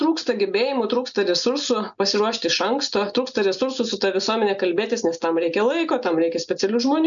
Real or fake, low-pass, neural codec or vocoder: real; 7.2 kHz; none